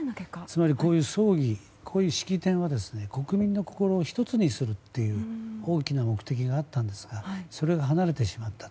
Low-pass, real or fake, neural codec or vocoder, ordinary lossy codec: none; real; none; none